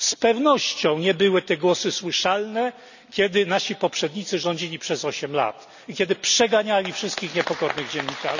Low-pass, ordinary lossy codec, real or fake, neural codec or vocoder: 7.2 kHz; none; real; none